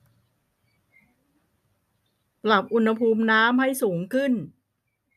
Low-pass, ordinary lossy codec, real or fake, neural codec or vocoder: 14.4 kHz; none; real; none